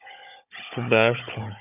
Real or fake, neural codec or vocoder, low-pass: fake; codec, 16 kHz, 16 kbps, FunCodec, trained on Chinese and English, 50 frames a second; 3.6 kHz